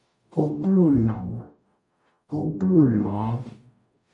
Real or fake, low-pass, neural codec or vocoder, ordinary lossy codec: fake; 10.8 kHz; codec, 44.1 kHz, 0.9 kbps, DAC; MP3, 64 kbps